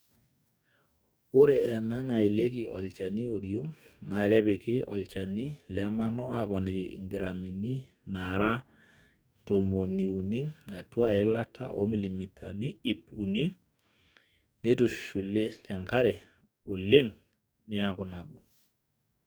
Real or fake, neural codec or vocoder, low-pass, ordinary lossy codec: fake; codec, 44.1 kHz, 2.6 kbps, DAC; none; none